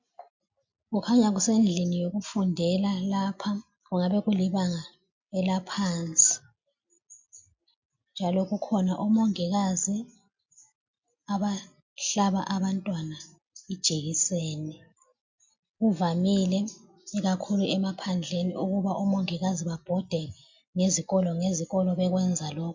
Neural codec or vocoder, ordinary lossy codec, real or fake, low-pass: none; MP3, 64 kbps; real; 7.2 kHz